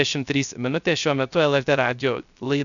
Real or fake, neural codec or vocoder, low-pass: fake; codec, 16 kHz, 0.3 kbps, FocalCodec; 7.2 kHz